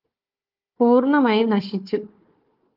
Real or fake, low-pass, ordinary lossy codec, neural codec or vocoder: fake; 5.4 kHz; Opus, 24 kbps; codec, 16 kHz, 4 kbps, FunCodec, trained on Chinese and English, 50 frames a second